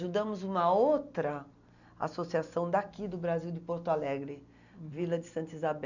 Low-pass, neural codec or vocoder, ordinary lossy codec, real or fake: 7.2 kHz; none; none; real